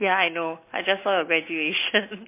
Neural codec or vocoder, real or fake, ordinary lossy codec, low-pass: none; real; MP3, 24 kbps; 3.6 kHz